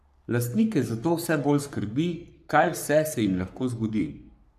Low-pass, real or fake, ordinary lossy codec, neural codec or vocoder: 14.4 kHz; fake; none; codec, 44.1 kHz, 3.4 kbps, Pupu-Codec